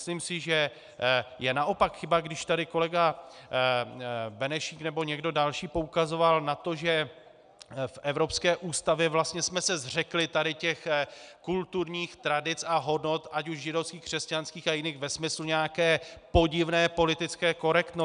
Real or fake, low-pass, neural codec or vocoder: real; 9.9 kHz; none